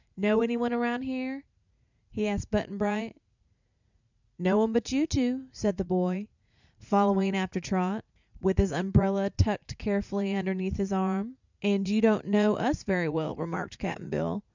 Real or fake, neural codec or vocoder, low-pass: fake; vocoder, 44.1 kHz, 80 mel bands, Vocos; 7.2 kHz